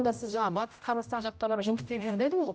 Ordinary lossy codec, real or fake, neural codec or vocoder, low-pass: none; fake; codec, 16 kHz, 0.5 kbps, X-Codec, HuBERT features, trained on general audio; none